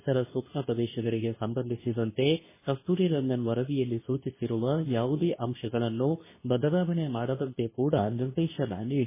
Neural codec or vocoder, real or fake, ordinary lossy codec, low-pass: codec, 24 kHz, 0.9 kbps, WavTokenizer, medium speech release version 2; fake; MP3, 16 kbps; 3.6 kHz